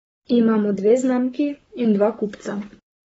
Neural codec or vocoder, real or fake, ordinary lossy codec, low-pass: codec, 44.1 kHz, 7.8 kbps, Pupu-Codec; fake; AAC, 24 kbps; 19.8 kHz